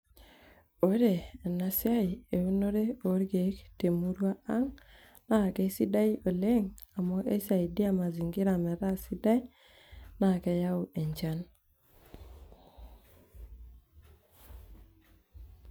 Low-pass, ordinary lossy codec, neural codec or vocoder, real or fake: none; none; none; real